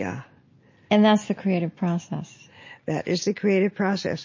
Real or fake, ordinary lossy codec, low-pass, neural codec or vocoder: real; MP3, 32 kbps; 7.2 kHz; none